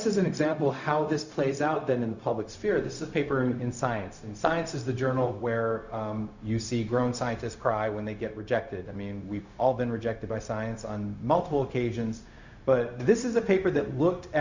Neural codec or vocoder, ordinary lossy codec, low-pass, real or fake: codec, 16 kHz, 0.4 kbps, LongCat-Audio-Codec; Opus, 64 kbps; 7.2 kHz; fake